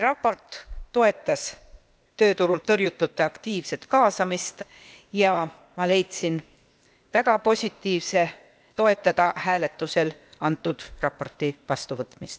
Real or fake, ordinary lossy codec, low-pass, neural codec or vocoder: fake; none; none; codec, 16 kHz, 0.8 kbps, ZipCodec